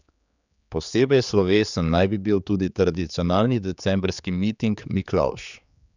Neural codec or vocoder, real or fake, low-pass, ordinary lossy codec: codec, 16 kHz, 4 kbps, X-Codec, HuBERT features, trained on general audio; fake; 7.2 kHz; none